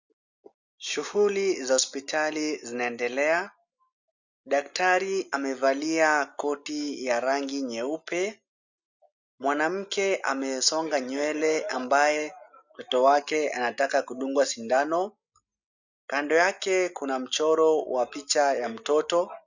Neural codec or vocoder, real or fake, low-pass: none; real; 7.2 kHz